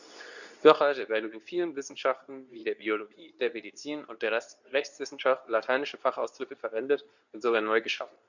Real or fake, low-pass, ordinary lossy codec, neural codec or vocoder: fake; 7.2 kHz; none; codec, 24 kHz, 0.9 kbps, WavTokenizer, medium speech release version 2